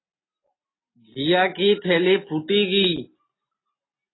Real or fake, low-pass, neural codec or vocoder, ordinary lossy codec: real; 7.2 kHz; none; AAC, 16 kbps